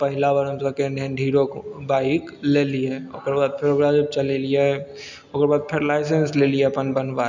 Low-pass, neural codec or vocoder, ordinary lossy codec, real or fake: 7.2 kHz; none; none; real